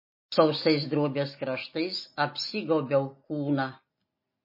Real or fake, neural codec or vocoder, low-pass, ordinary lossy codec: real; none; 5.4 kHz; MP3, 24 kbps